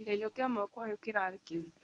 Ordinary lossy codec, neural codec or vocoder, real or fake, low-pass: none; codec, 24 kHz, 0.9 kbps, WavTokenizer, medium speech release version 1; fake; 10.8 kHz